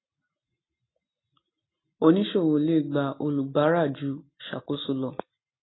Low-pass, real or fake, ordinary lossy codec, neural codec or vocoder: 7.2 kHz; real; AAC, 16 kbps; none